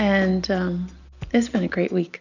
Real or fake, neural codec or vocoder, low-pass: real; none; 7.2 kHz